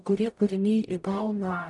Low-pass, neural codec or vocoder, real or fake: 10.8 kHz; codec, 44.1 kHz, 0.9 kbps, DAC; fake